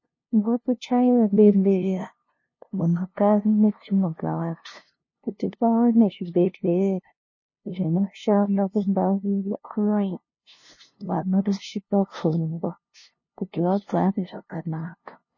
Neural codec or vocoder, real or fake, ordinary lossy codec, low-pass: codec, 16 kHz, 0.5 kbps, FunCodec, trained on LibriTTS, 25 frames a second; fake; MP3, 32 kbps; 7.2 kHz